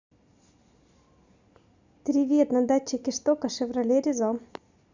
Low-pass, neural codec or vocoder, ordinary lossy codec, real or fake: 7.2 kHz; none; Opus, 64 kbps; real